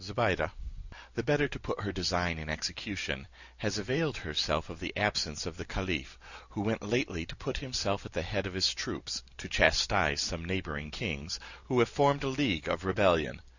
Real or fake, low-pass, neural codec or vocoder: real; 7.2 kHz; none